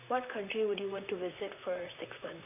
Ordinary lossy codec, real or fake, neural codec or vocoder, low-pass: none; fake; vocoder, 44.1 kHz, 128 mel bands, Pupu-Vocoder; 3.6 kHz